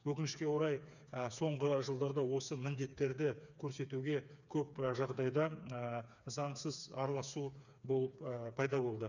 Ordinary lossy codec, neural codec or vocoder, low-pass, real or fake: none; codec, 16 kHz, 4 kbps, FreqCodec, smaller model; 7.2 kHz; fake